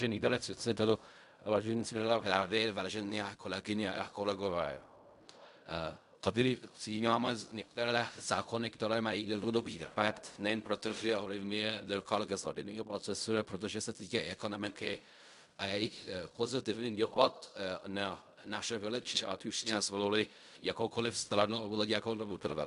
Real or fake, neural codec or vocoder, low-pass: fake; codec, 16 kHz in and 24 kHz out, 0.4 kbps, LongCat-Audio-Codec, fine tuned four codebook decoder; 10.8 kHz